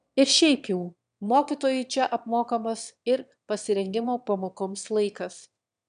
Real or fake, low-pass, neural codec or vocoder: fake; 9.9 kHz; autoencoder, 22.05 kHz, a latent of 192 numbers a frame, VITS, trained on one speaker